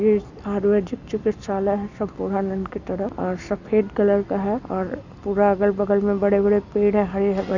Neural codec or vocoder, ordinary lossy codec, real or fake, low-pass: none; none; real; 7.2 kHz